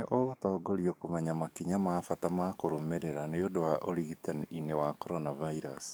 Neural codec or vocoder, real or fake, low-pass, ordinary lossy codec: codec, 44.1 kHz, 7.8 kbps, DAC; fake; none; none